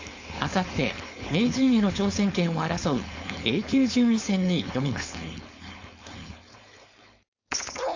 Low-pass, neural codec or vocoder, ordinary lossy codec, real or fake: 7.2 kHz; codec, 16 kHz, 4.8 kbps, FACodec; AAC, 48 kbps; fake